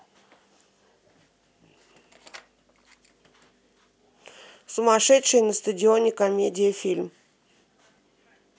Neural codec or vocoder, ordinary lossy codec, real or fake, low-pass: none; none; real; none